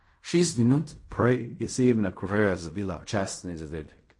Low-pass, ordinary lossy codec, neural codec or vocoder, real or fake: 10.8 kHz; MP3, 48 kbps; codec, 16 kHz in and 24 kHz out, 0.4 kbps, LongCat-Audio-Codec, fine tuned four codebook decoder; fake